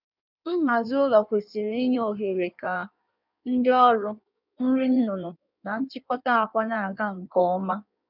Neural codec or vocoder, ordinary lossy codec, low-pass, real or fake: codec, 16 kHz in and 24 kHz out, 1.1 kbps, FireRedTTS-2 codec; none; 5.4 kHz; fake